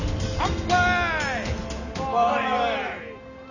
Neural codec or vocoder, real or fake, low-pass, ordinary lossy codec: none; real; 7.2 kHz; none